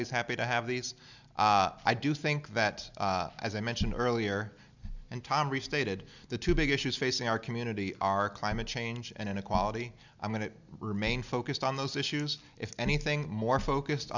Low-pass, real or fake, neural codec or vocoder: 7.2 kHz; real; none